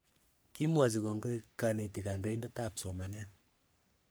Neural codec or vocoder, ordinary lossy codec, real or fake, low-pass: codec, 44.1 kHz, 3.4 kbps, Pupu-Codec; none; fake; none